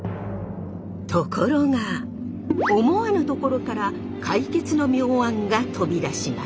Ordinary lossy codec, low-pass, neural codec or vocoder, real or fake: none; none; none; real